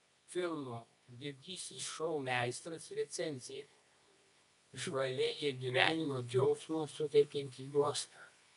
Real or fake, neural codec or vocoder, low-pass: fake; codec, 24 kHz, 0.9 kbps, WavTokenizer, medium music audio release; 10.8 kHz